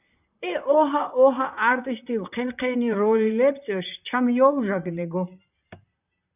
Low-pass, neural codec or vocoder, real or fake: 3.6 kHz; vocoder, 44.1 kHz, 128 mel bands, Pupu-Vocoder; fake